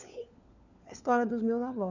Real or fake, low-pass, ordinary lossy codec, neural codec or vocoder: fake; 7.2 kHz; none; codec, 16 kHz, 4 kbps, FunCodec, trained on LibriTTS, 50 frames a second